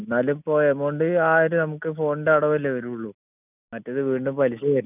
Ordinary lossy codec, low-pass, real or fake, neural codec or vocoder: none; 3.6 kHz; real; none